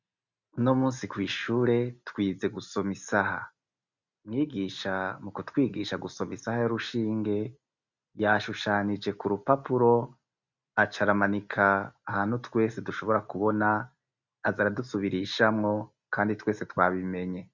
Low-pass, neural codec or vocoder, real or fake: 7.2 kHz; none; real